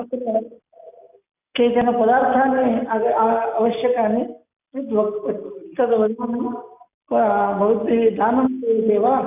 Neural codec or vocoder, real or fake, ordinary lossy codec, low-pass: none; real; none; 3.6 kHz